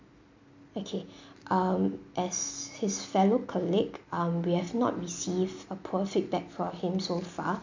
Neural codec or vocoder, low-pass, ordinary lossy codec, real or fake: none; 7.2 kHz; MP3, 64 kbps; real